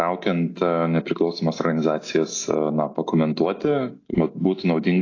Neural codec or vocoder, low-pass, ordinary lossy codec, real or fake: none; 7.2 kHz; AAC, 32 kbps; real